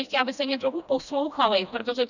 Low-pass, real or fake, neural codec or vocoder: 7.2 kHz; fake; codec, 16 kHz, 1 kbps, FreqCodec, smaller model